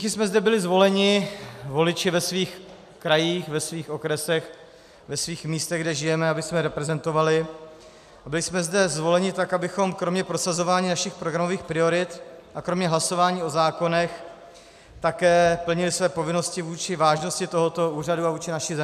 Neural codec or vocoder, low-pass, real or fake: none; 14.4 kHz; real